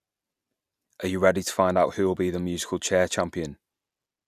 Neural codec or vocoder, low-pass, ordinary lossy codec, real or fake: none; 14.4 kHz; none; real